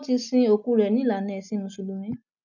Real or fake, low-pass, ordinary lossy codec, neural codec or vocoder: real; 7.2 kHz; none; none